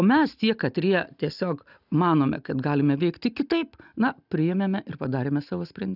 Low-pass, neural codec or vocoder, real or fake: 5.4 kHz; none; real